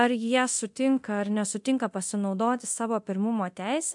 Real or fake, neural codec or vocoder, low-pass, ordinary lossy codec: fake; codec, 24 kHz, 0.9 kbps, DualCodec; 10.8 kHz; MP3, 64 kbps